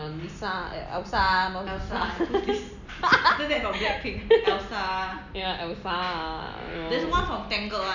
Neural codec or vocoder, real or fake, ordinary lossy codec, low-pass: none; real; none; 7.2 kHz